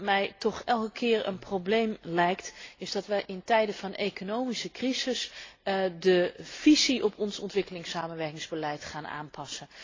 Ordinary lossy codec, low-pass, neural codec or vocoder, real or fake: AAC, 32 kbps; 7.2 kHz; none; real